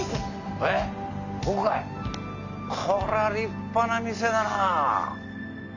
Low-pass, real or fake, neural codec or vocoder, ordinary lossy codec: 7.2 kHz; real; none; none